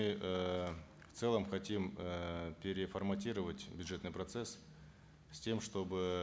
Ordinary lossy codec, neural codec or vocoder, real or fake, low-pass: none; none; real; none